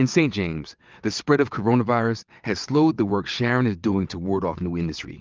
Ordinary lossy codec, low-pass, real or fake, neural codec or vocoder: Opus, 32 kbps; 7.2 kHz; fake; vocoder, 22.05 kHz, 80 mel bands, WaveNeXt